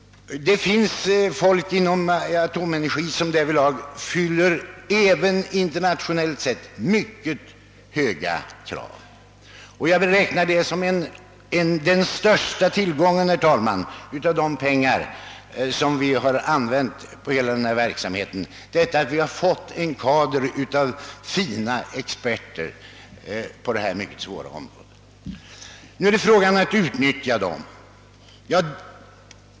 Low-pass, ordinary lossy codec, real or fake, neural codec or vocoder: none; none; real; none